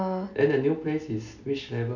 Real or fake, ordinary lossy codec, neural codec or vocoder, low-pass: real; none; none; 7.2 kHz